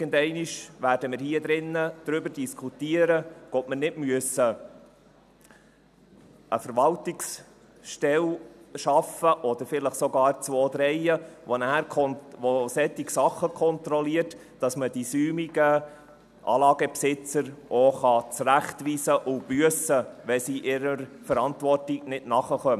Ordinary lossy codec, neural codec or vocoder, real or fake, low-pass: none; none; real; 14.4 kHz